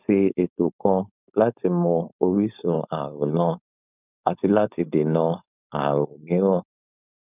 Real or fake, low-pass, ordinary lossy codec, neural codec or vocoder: fake; 3.6 kHz; none; codec, 16 kHz, 4.8 kbps, FACodec